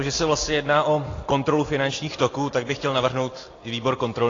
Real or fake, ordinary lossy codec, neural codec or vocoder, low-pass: real; AAC, 32 kbps; none; 7.2 kHz